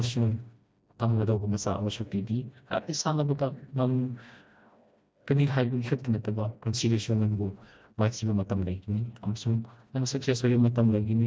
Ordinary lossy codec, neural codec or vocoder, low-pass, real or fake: none; codec, 16 kHz, 1 kbps, FreqCodec, smaller model; none; fake